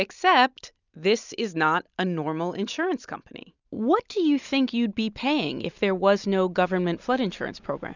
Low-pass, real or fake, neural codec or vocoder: 7.2 kHz; real; none